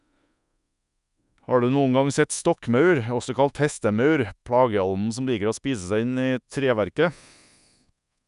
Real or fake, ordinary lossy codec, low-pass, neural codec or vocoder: fake; none; 10.8 kHz; codec, 24 kHz, 1.2 kbps, DualCodec